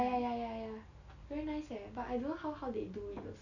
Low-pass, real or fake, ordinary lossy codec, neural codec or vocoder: 7.2 kHz; real; none; none